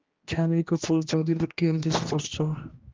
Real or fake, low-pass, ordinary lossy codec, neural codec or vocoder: fake; 7.2 kHz; Opus, 32 kbps; codec, 16 kHz, 1 kbps, X-Codec, HuBERT features, trained on general audio